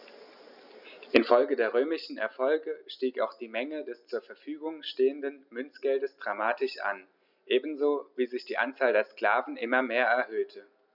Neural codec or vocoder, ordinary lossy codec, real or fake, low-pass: none; none; real; 5.4 kHz